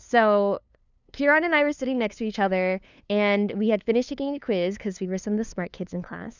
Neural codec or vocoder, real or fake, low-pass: codec, 16 kHz, 2 kbps, FunCodec, trained on LibriTTS, 25 frames a second; fake; 7.2 kHz